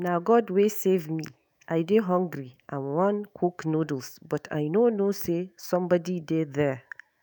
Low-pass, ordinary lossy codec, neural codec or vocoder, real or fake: none; none; autoencoder, 48 kHz, 128 numbers a frame, DAC-VAE, trained on Japanese speech; fake